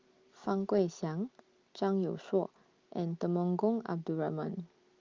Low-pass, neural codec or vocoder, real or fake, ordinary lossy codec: 7.2 kHz; none; real; Opus, 32 kbps